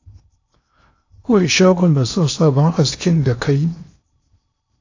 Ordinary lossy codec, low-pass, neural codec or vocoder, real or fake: AAC, 48 kbps; 7.2 kHz; codec, 16 kHz in and 24 kHz out, 0.8 kbps, FocalCodec, streaming, 65536 codes; fake